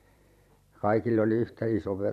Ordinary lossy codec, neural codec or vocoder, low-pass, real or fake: none; vocoder, 44.1 kHz, 128 mel bands every 512 samples, BigVGAN v2; 14.4 kHz; fake